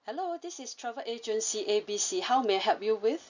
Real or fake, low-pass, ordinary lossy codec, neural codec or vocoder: real; 7.2 kHz; none; none